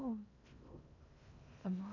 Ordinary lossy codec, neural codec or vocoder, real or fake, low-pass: none; codec, 16 kHz in and 24 kHz out, 0.8 kbps, FocalCodec, streaming, 65536 codes; fake; 7.2 kHz